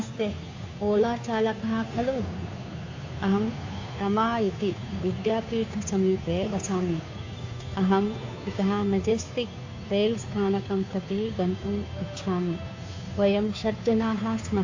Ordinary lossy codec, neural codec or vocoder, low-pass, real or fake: AAC, 48 kbps; codec, 16 kHz, 2 kbps, FunCodec, trained on Chinese and English, 25 frames a second; 7.2 kHz; fake